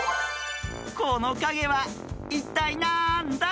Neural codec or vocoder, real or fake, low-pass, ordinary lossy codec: none; real; none; none